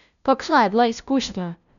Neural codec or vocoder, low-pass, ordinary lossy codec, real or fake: codec, 16 kHz, 0.5 kbps, FunCodec, trained on LibriTTS, 25 frames a second; 7.2 kHz; none; fake